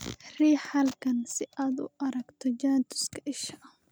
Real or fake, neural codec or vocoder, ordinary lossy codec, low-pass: real; none; none; none